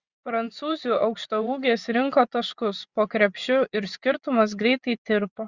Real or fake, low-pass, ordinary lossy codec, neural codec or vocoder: fake; 7.2 kHz; Opus, 64 kbps; vocoder, 24 kHz, 100 mel bands, Vocos